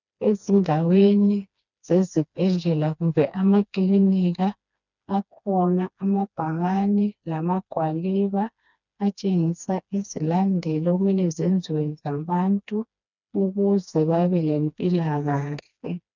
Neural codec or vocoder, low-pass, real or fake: codec, 16 kHz, 2 kbps, FreqCodec, smaller model; 7.2 kHz; fake